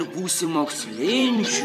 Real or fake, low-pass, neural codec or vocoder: fake; 14.4 kHz; vocoder, 44.1 kHz, 128 mel bands every 256 samples, BigVGAN v2